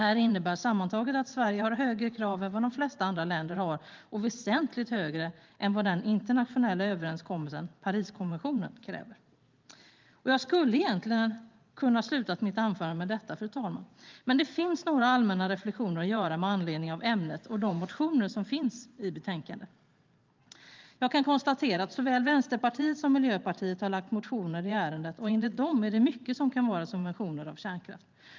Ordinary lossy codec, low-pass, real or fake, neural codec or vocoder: Opus, 24 kbps; 7.2 kHz; fake; vocoder, 44.1 kHz, 128 mel bands every 512 samples, BigVGAN v2